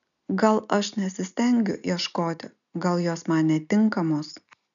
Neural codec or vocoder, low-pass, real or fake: none; 7.2 kHz; real